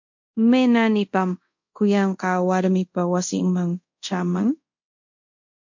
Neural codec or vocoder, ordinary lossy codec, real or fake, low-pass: codec, 24 kHz, 0.5 kbps, DualCodec; MP3, 48 kbps; fake; 7.2 kHz